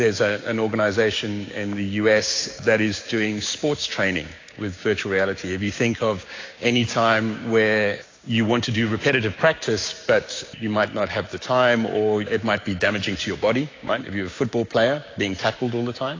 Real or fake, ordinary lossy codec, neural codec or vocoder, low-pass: fake; AAC, 32 kbps; codec, 16 kHz, 6 kbps, DAC; 7.2 kHz